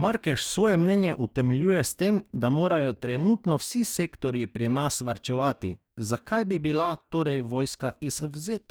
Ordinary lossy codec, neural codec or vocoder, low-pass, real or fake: none; codec, 44.1 kHz, 2.6 kbps, DAC; none; fake